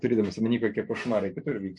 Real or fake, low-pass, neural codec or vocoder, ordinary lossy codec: real; 10.8 kHz; none; MP3, 48 kbps